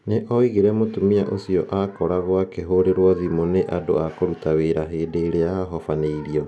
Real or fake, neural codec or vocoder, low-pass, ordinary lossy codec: real; none; none; none